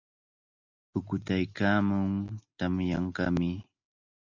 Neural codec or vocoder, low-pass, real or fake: none; 7.2 kHz; real